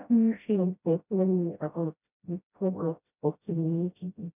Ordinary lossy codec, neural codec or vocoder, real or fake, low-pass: none; codec, 16 kHz, 0.5 kbps, FreqCodec, smaller model; fake; 3.6 kHz